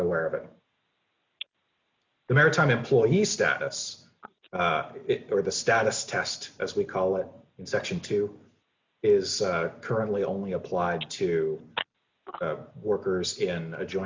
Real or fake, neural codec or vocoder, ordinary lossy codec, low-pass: real; none; MP3, 64 kbps; 7.2 kHz